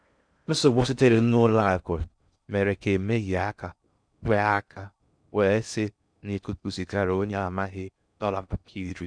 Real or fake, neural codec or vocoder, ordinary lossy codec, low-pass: fake; codec, 16 kHz in and 24 kHz out, 0.6 kbps, FocalCodec, streaming, 4096 codes; none; 9.9 kHz